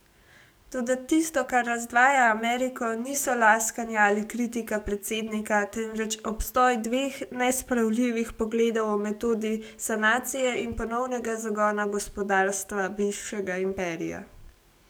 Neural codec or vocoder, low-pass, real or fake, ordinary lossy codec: codec, 44.1 kHz, 7.8 kbps, DAC; none; fake; none